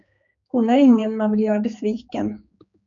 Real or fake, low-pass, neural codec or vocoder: fake; 7.2 kHz; codec, 16 kHz, 4 kbps, X-Codec, HuBERT features, trained on general audio